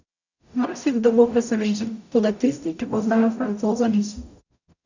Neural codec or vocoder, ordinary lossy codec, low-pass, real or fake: codec, 44.1 kHz, 0.9 kbps, DAC; none; 7.2 kHz; fake